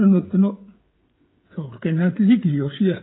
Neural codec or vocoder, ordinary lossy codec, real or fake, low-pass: codec, 16 kHz, 16 kbps, FreqCodec, smaller model; AAC, 16 kbps; fake; 7.2 kHz